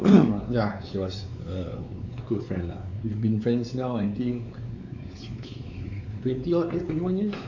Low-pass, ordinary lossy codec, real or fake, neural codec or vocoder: 7.2 kHz; AAC, 48 kbps; fake; codec, 16 kHz, 4 kbps, X-Codec, WavLM features, trained on Multilingual LibriSpeech